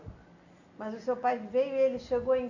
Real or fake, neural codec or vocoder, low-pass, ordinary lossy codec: real; none; 7.2 kHz; none